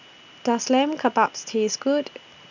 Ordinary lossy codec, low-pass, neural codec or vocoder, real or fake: none; 7.2 kHz; none; real